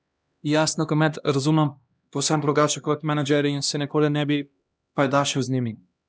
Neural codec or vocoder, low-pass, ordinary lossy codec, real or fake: codec, 16 kHz, 1 kbps, X-Codec, HuBERT features, trained on LibriSpeech; none; none; fake